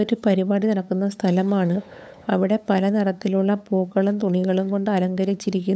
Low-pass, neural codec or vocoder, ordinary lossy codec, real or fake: none; codec, 16 kHz, 8 kbps, FunCodec, trained on LibriTTS, 25 frames a second; none; fake